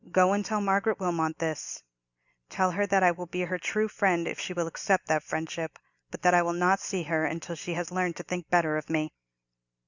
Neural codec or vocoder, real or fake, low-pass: none; real; 7.2 kHz